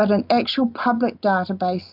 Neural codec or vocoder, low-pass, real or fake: none; 5.4 kHz; real